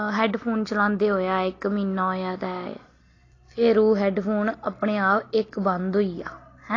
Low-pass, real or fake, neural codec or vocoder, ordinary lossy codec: 7.2 kHz; real; none; AAC, 32 kbps